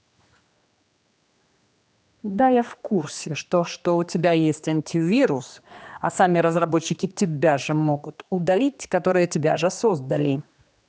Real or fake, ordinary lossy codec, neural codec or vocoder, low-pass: fake; none; codec, 16 kHz, 2 kbps, X-Codec, HuBERT features, trained on general audio; none